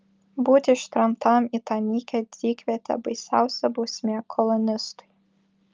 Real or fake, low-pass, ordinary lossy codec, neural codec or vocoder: real; 7.2 kHz; Opus, 24 kbps; none